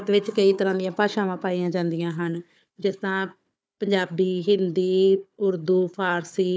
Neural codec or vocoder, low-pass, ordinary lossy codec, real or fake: codec, 16 kHz, 4 kbps, FunCodec, trained on Chinese and English, 50 frames a second; none; none; fake